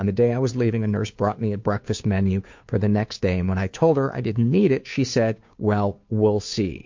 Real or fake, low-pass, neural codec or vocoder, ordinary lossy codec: fake; 7.2 kHz; codec, 16 kHz, 2 kbps, FunCodec, trained on Chinese and English, 25 frames a second; MP3, 48 kbps